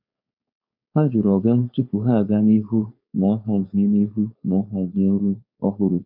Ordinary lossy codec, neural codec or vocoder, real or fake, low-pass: MP3, 48 kbps; codec, 16 kHz, 4.8 kbps, FACodec; fake; 5.4 kHz